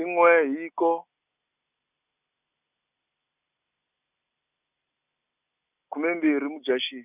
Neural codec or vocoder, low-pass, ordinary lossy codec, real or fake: none; 3.6 kHz; none; real